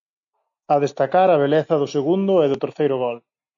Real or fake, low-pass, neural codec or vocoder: real; 7.2 kHz; none